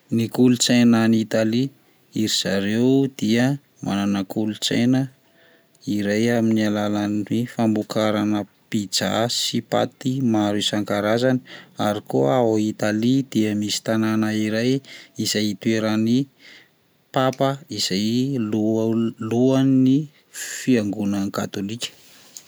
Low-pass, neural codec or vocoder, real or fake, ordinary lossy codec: none; none; real; none